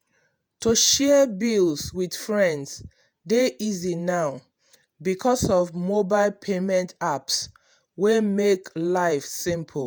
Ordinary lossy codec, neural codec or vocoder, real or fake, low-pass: none; vocoder, 48 kHz, 128 mel bands, Vocos; fake; none